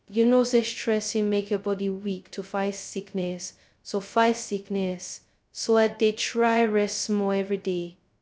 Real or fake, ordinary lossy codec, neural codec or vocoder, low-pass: fake; none; codec, 16 kHz, 0.2 kbps, FocalCodec; none